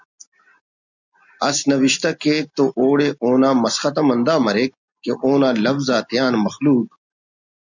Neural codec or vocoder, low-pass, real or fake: none; 7.2 kHz; real